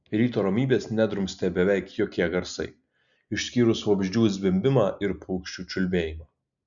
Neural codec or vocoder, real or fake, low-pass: none; real; 7.2 kHz